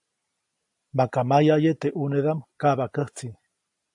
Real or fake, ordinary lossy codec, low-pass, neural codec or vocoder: real; AAC, 64 kbps; 10.8 kHz; none